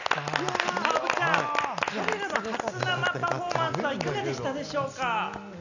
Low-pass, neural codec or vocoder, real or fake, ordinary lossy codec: 7.2 kHz; none; real; none